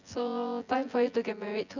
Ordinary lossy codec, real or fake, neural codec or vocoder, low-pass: none; fake; vocoder, 24 kHz, 100 mel bands, Vocos; 7.2 kHz